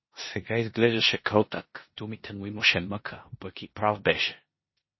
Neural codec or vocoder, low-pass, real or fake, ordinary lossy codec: codec, 16 kHz in and 24 kHz out, 0.9 kbps, LongCat-Audio-Codec, four codebook decoder; 7.2 kHz; fake; MP3, 24 kbps